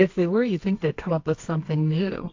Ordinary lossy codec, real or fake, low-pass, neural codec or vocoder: AAC, 48 kbps; fake; 7.2 kHz; codec, 24 kHz, 0.9 kbps, WavTokenizer, medium music audio release